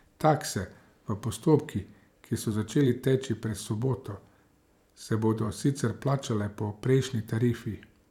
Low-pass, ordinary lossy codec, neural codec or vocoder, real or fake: 19.8 kHz; none; vocoder, 44.1 kHz, 128 mel bands every 512 samples, BigVGAN v2; fake